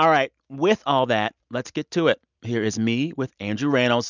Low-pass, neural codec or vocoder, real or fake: 7.2 kHz; none; real